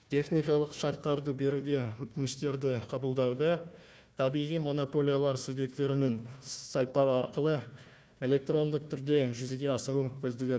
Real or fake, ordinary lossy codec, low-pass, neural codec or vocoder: fake; none; none; codec, 16 kHz, 1 kbps, FunCodec, trained on Chinese and English, 50 frames a second